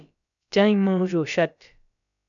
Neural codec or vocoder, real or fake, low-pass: codec, 16 kHz, about 1 kbps, DyCAST, with the encoder's durations; fake; 7.2 kHz